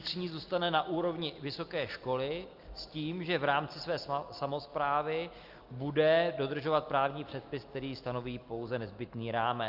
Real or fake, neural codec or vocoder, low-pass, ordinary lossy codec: real; none; 5.4 kHz; Opus, 32 kbps